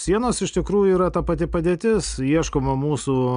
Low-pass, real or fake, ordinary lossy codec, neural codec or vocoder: 9.9 kHz; real; AAC, 96 kbps; none